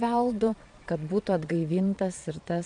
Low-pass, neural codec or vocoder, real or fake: 9.9 kHz; vocoder, 22.05 kHz, 80 mel bands, Vocos; fake